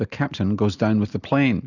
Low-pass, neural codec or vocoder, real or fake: 7.2 kHz; none; real